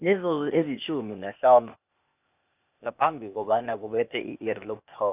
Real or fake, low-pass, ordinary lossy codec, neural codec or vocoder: fake; 3.6 kHz; none; codec, 16 kHz, 0.8 kbps, ZipCodec